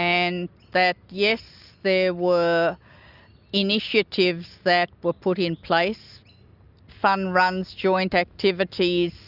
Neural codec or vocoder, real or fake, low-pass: none; real; 5.4 kHz